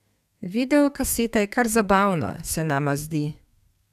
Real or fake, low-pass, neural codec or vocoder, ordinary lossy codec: fake; 14.4 kHz; codec, 32 kHz, 1.9 kbps, SNAC; none